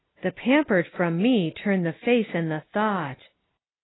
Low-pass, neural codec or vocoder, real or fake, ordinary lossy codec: 7.2 kHz; none; real; AAC, 16 kbps